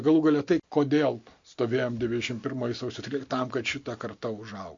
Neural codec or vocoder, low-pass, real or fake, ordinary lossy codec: none; 7.2 kHz; real; MP3, 48 kbps